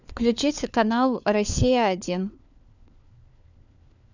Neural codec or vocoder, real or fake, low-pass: codec, 16 kHz, 4 kbps, FunCodec, trained on LibriTTS, 50 frames a second; fake; 7.2 kHz